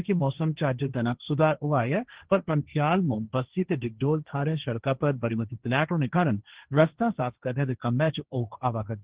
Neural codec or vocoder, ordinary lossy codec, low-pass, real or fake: codec, 16 kHz, 1.1 kbps, Voila-Tokenizer; Opus, 16 kbps; 3.6 kHz; fake